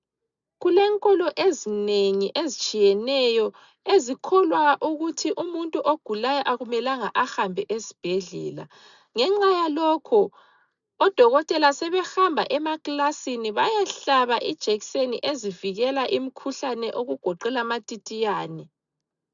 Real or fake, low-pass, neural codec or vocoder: real; 7.2 kHz; none